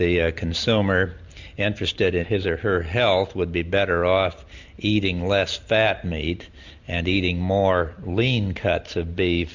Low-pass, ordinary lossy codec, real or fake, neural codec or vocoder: 7.2 kHz; MP3, 64 kbps; real; none